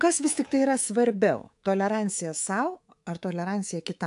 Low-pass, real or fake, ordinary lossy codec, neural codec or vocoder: 10.8 kHz; fake; AAC, 64 kbps; codec, 24 kHz, 3.1 kbps, DualCodec